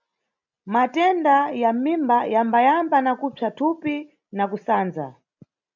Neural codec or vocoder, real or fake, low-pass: none; real; 7.2 kHz